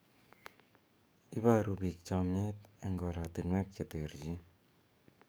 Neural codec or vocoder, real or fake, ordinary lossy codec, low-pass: codec, 44.1 kHz, 7.8 kbps, DAC; fake; none; none